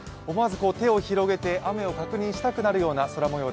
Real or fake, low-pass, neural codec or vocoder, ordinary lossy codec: real; none; none; none